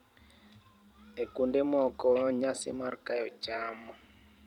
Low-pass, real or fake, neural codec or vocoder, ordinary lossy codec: 19.8 kHz; fake; vocoder, 44.1 kHz, 128 mel bands every 512 samples, BigVGAN v2; none